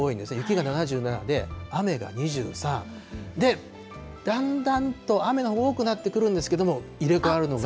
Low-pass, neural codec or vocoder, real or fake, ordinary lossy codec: none; none; real; none